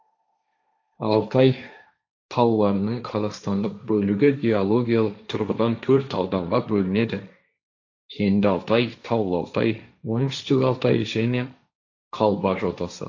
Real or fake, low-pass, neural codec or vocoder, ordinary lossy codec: fake; 7.2 kHz; codec, 16 kHz, 1.1 kbps, Voila-Tokenizer; AAC, 48 kbps